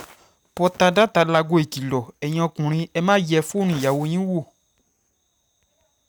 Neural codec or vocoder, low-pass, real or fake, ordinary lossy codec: none; none; real; none